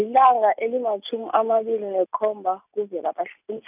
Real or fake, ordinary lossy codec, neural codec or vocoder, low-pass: real; none; none; 3.6 kHz